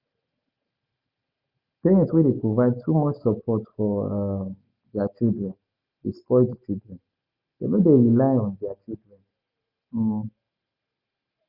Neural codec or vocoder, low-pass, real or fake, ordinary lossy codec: none; 5.4 kHz; real; Opus, 64 kbps